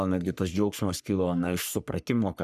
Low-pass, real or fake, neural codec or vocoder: 14.4 kHz; fake; codec, 44.1 kHz, 3.4 kbps, Pupu-Codec